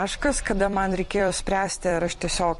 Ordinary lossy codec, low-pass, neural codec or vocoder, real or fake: MP3, 48 kbps; 14.4 kHz; vocoder, 44.1 kHz, 128 mel bands every 256 samples, BigVGAN v2; fake